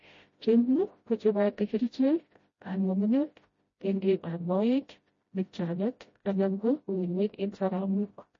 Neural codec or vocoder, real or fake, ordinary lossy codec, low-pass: codec, 16 kHz, 0.5 kbps, FreqCodec, smaller model; fake; MP3, 32 kbps; 7.2 kHz